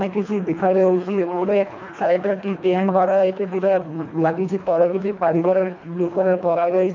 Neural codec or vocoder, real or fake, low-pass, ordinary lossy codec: codec, 24 kHz, 1.5 kbps, HILCodec; fake; 7.2 kHz; MP3, 48 kbps